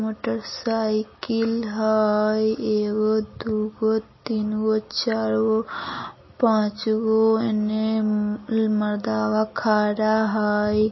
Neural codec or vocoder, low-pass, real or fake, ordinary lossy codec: none; 7.2 kHz; real; MP3, 24 kbps